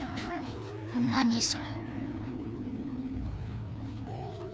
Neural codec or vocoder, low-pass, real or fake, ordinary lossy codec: codec, 16 kHz, 2 kbps, FreqCodec, larger model; none; fake; none